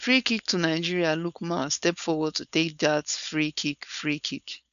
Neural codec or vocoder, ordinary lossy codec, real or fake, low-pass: codec, 16 kHz, 4.8 kbps, FACodec; none; fake; 7.2 kHz